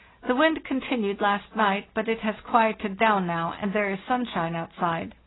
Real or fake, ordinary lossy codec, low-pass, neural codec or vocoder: real; AAC, 16 kbps; 7.2 kHz; none